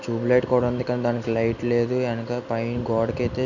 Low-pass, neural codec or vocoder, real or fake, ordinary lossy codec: 7.2 kHz; none; real; none